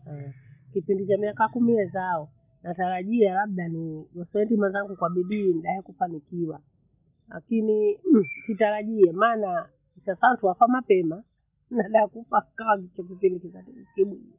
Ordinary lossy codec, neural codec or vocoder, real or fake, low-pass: none; none; real; 3.6 kHz